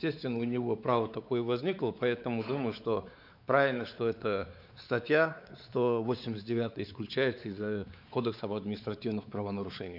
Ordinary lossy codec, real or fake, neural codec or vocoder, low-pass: none; fake; codec, 16 kHz, 4 kbps, X-Codec, WavLM features, trained on Multilingual LibriSpeech; 5.4 kHz